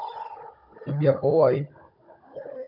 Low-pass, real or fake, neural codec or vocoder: 5.4 kHz; fake; codec, 16 kHz, 16 kbps, FunCodec, trained on Chinese and English, 50 frames a second